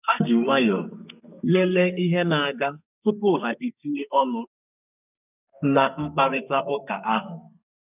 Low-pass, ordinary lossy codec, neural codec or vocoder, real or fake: 3.6 kHz; none; codec, 32 kHz, 1.9 kbps, SNAC; fake